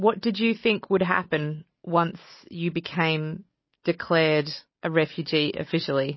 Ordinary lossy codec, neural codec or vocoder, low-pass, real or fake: MP3, 24 kbps; none; 7.2 kHz; real